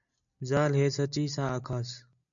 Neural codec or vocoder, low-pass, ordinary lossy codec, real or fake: none; 7.2 kHz; MP3, 96 kbps; real